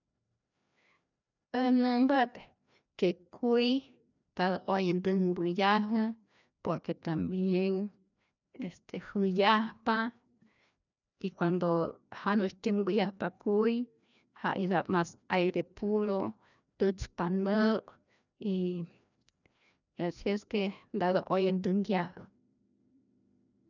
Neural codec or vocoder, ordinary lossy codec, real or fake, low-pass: codec, 16 kHz, 1 kbps, FreqCodec, larger model; none; fake; 7.2 kHz